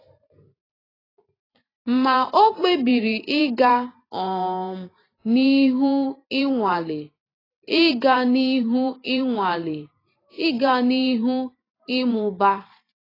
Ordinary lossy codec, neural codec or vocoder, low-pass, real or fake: AAC, 24 kbps; vocoder, 44.1 kHz, 128 mel bands every 512 samples, BigVGAN v2; 5.4 kHz; fake